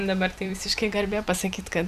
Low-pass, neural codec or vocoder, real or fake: 14.4 kHz; vocoder, 44.1 kHz, 128 mel bands every 256 samples, BigVGAN v2; fake